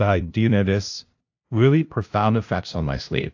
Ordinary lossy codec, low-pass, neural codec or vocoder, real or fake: AAC, 48 kbps; 7.2 kHz; codec, 16 kHz, 0.5 kbps, FunCodec, trained on LibriTTS, 25 frames a second; fake